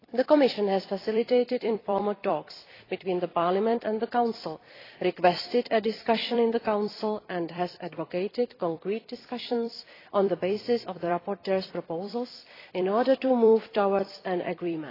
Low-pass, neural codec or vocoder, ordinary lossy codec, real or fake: 5.4 kHz; vocoder, 44.1 kHz, 128 mel bands every 256 samples, BigVGAN v2; AAC, 24 kbps; fake